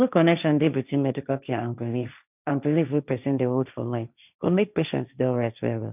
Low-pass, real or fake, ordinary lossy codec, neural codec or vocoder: 3.6 kHz; fake; none; codec, 16 kHz, 1.1 kbps, Voila-Tokenizer